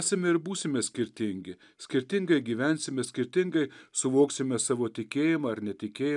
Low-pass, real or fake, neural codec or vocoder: 10.8 kHz; real; none